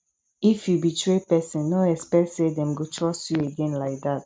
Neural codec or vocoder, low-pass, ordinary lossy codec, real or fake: none; none; none; real